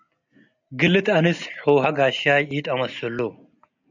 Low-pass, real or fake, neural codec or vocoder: 7.2 kHz; real; none